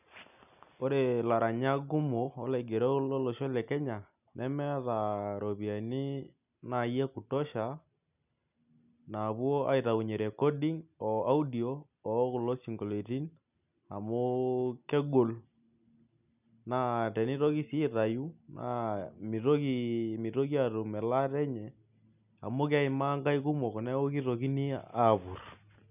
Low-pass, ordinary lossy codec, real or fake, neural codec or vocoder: 3.6 kHz; none; real; none